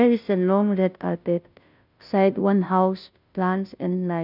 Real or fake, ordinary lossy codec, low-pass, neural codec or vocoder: fake; none; 5.4 kHz; codec, 16 kHz, 0.5 kbps, FunCodec, trained on Chinese and English, 25 frames a second